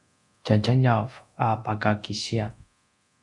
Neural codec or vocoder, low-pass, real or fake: codec, 24 kHz, 0.9 kbps, DualCodec; 10.8 kHz; fake